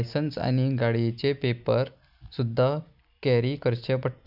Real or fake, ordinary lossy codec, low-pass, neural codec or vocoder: real; none; 5.4 kHz; none